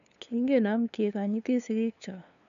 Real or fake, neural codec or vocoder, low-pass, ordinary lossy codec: fake; codec, 16 kHz, 2 kbps, FunCodec, trained on LibriTTS, 25 frames a second; 7.2 kHz; none